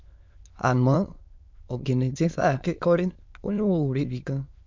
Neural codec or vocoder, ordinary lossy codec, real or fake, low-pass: autoencoder, 22.05 kHz, a latent of 192 numbers a frame, VITS, trained on many speakers; MP3, 64 kbps; fake; 7.2 kHz